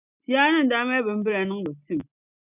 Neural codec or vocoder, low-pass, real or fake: none; 3.6 kHz; real